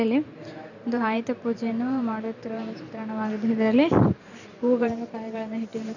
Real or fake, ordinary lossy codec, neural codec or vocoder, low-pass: fake; none; vocoder, 44.1 kHz, 128 mel bands every 512 samples, BigVGAN v2; 7.2 kHz